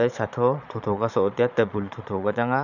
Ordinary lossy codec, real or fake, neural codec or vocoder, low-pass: none; fake; vocoder, 22.05 kHz, 80 mel bands, Vocos; 7.2 kHz